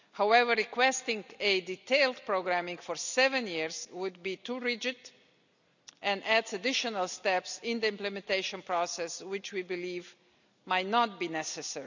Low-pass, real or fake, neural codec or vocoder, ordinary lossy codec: 7.2 kHz; real; none; none